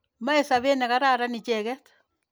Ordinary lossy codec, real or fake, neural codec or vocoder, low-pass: none; real; none; none